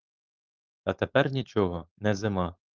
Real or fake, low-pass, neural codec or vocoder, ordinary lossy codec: fake; 7.2 kHz; codec, 16 kHz, 4.8 kbps, FACodec; Opus, 32 kbps